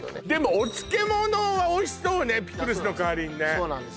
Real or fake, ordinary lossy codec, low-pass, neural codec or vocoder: real; none; none; none